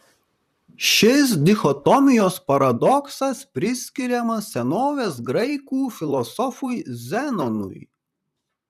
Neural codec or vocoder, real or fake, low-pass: vocoder, 44.1 kHz, 128 mel bands, Pupu-Vocoder; fake; 14.4 kHz